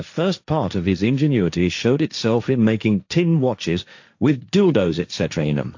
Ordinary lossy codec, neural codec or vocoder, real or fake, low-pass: AAC, 48 kbps; codec, 16 kHz, 1.1 kbps, Voila-Tokenizer; fake; 7.2 kHz